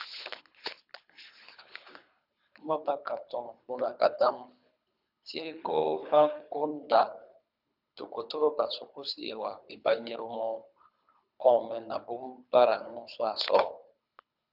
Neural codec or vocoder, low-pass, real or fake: codec, 24 kHz, 3 kbps, HILCodec; 5.4 kHz; fake